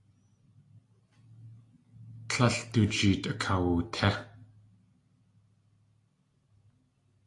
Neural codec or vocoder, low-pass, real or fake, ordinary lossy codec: none; 10.8 kHz; real; AAC, 48 kbps